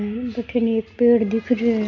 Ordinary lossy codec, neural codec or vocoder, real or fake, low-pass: none; none; real; 7.2 kHz